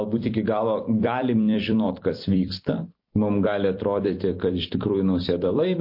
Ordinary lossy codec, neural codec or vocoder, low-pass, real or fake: MP3, 32 kbps; vocoder, 44.1 kHz, 128 mel bands every 256 samples, BigVGAN v2; 5.4 kHz; fake